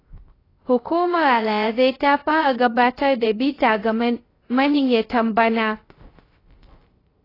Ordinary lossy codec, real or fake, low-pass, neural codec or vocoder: AAC, 24 kbps; fake; 5.4 kHz; codec, 16 kHz, 0.3 kbps, FocalCodec